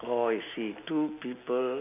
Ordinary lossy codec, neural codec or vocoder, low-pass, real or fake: none; none; 3.6 kHz; real